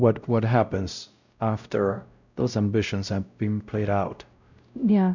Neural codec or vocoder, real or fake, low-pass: codec, 16 kHz, 0.5 kbps, X-Codec, WavLM features, trained on Multilingual LibriSpeech; fake; 7.2 kHz